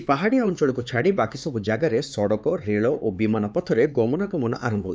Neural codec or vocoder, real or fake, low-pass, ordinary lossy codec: codec, 16 kHz, 4 kbps, X-Codec, HuBERT features, trained on LibriSpeech; fake; none; none